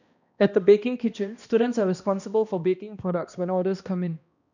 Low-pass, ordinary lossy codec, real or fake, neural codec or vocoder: 7.2 kHz; none; fake; codec, 16 kHz, 1 kbps, X-Codec, HuBERT features, trained on balanced general audio